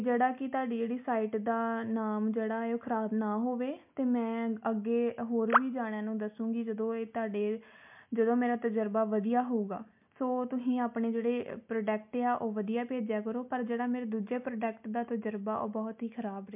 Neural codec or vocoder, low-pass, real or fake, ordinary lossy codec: none; 3.6 kHz; real; MP3, 32 kbps